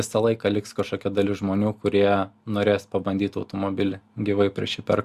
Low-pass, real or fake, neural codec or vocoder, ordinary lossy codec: 14.4 kHz; real; none; Opus, 64 kbps